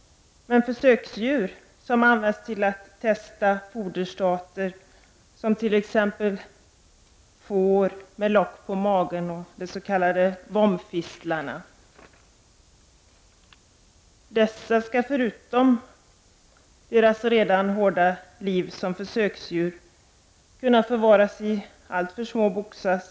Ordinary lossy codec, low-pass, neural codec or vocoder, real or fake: none; none; none; real